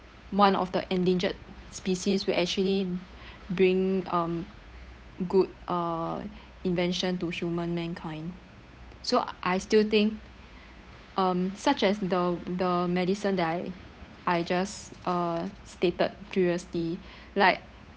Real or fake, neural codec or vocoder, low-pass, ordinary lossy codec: fake; codec, 16 kHz, 8 kbps, FunCodec, trained on Chinese and English, 25 frames a second; none; none